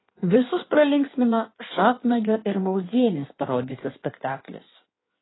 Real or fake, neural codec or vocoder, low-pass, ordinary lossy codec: fake; codec, 16 kHz in and 24 kHz out, 1.1 kbps, FireRedTTS-2 codec; 7.2 kHz; AAC, 16 kbps